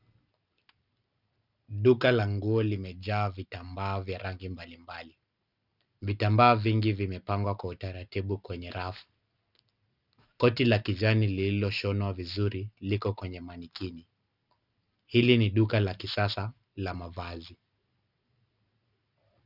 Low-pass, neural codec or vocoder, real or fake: 5.4 kHz; none; real